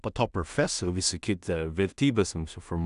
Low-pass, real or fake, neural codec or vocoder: 10.8 kHz; fake; codec, 16 kHz in and 24 kHz out, 0.4 kbps, LongCat-Audio-Codec, two codebook decoder